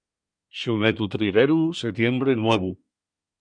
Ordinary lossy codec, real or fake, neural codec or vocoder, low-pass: MP3, 96 kbps; fake; codec, 24 kHz, 1 kbps, SNAC; 9.9 kHz